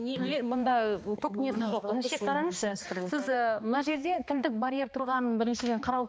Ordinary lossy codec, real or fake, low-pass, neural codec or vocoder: none; fake; none; codec, 16 kHz, 2 kbps, X-Codec, HuBERT features, trained on balanced general audio